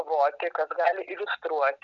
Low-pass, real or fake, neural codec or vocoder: 7.2 kHz; real; none